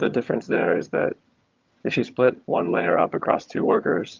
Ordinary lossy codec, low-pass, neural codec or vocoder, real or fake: Opus, 24 kbps; 7.2 kHz; vocoder, 22.05 kHz, 80 mel bands, HiFi-GAN; fake